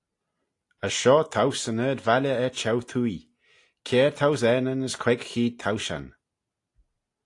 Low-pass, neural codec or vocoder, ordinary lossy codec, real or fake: 10.8 kHz; none; AAC, 48 kbps; real